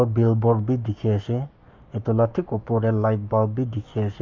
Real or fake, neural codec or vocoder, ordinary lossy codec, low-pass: fake; codec, 44.1 kHz, 7.8 kbps, Pupu-Codec; MP3, 64 kbps; 7.2 kHz